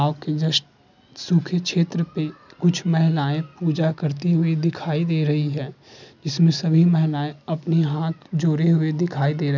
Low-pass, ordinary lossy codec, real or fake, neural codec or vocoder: 7.2 kHz; none; real; none